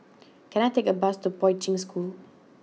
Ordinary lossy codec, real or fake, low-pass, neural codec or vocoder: none; real; none; none